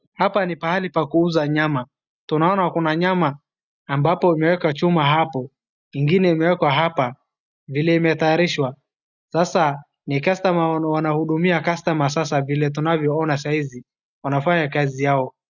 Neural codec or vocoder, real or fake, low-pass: none; real; 7.2 kHz